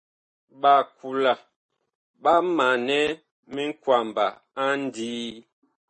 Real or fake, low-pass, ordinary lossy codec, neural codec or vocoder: real; 9.9 kHz; MP3, 32 kbps; none